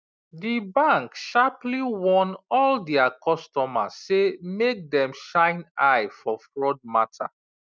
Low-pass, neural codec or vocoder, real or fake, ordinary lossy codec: none; none; real; none